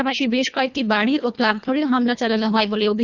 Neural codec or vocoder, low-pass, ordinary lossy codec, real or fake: codec, 24 kHz, 1.5 kbps, HILCodec; 7.2 kHz; none; fake